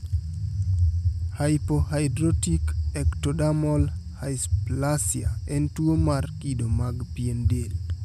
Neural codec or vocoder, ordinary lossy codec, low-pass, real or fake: none; none; 14.4 kHz; real